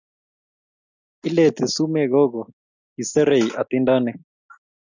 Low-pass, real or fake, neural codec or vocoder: 7.2 kHz; real; none